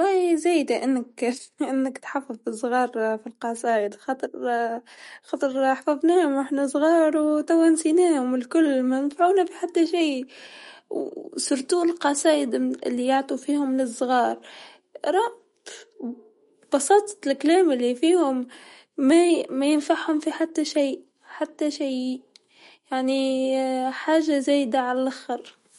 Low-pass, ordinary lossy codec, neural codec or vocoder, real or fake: 19.8 kHz; MP3, 48 kbps; autoencoder, 48 kHz, 128 numbers a frame, DAC-VAE, trained on Japanese speech; fake